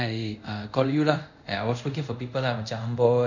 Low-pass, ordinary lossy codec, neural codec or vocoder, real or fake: 7.2 kHz; none; codec, 24 kHz, 0.5 kbps, DualCodec; fake